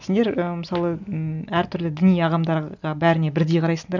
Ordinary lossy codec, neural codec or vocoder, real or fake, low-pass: none; none; real; 7.2 kHz